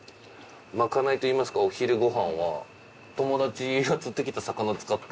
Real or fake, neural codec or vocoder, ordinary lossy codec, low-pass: real; none; none; none